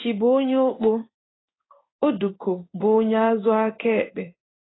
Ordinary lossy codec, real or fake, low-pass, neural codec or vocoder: AAC, 16 kbps; fake; 7.2 kHz; codec, 16 kHz in and 24 kHz out, 1 kbps, XY-Tokenizer